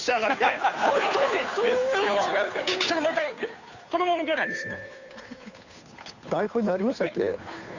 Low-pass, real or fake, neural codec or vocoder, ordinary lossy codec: 7.2 kHz; fake; codec, 16 kHz, 2 kbps, FunCodec, trained on Chinese and English, 25 frames a second; none